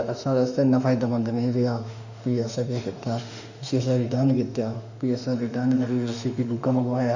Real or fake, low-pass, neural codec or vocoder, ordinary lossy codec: fake; 7.2 kHz; autoencoder, 48 kHz, 32 numbers a frame, DAC-VAE, trained on Japanese speech; none